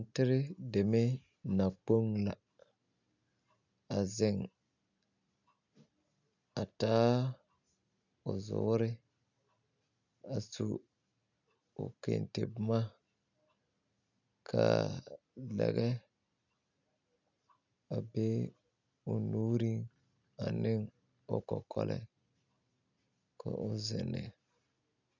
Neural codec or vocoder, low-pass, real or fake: none; 7.2 kHz; real